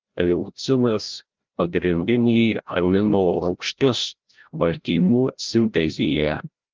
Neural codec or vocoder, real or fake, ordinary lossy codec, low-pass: codec, 16 kHz, 0.5 kbps, FreqCodec, larger model; fake; Opus, 32 kbps; 7.2 kHz